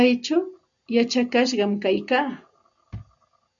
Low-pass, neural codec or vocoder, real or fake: 7.2 kHz; none; real